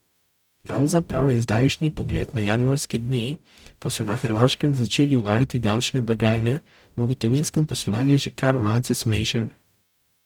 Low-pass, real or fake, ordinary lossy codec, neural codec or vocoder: 19.8 kHz; fake; none; codec, 44.1 kHz, 0.9 kbps, DAC